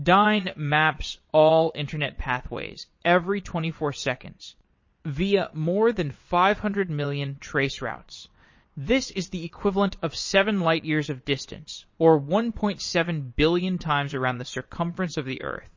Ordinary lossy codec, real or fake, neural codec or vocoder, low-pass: MP3, 32 kbps; fake; vocoder, 22.05 kHz, 80 mel bands, Vocos; 7.2 kHz